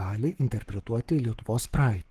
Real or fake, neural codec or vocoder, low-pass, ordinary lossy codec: fake; codec, 44.1 kHz, 7.8 kbps, Pupu-Codec; 14.4 kHz; Opus, 16 kbps